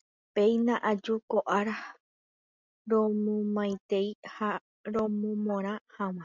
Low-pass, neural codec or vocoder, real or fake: 7.2 kHz; none; real